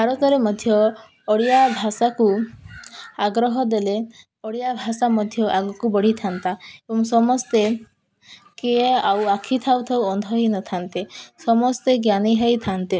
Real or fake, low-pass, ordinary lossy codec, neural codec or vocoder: real; none; none; none